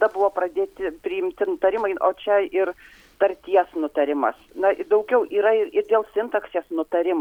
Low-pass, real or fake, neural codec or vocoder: 19.8 kHz; real; none